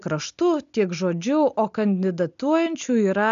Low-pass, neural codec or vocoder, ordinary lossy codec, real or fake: 7.2 kHz; none; AAC, 96 kbps; real